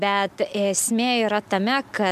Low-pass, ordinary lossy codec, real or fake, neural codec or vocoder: 14.4 kHz; MP3, 96 kbps; real; none